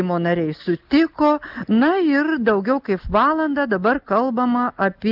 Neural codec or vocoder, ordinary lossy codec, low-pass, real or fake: none; Opus, 32 kbps; 5.4 kHz; real